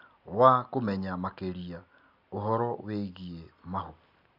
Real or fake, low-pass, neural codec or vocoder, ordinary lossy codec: real; 5.4 kHz; none; Opus, 64 kbps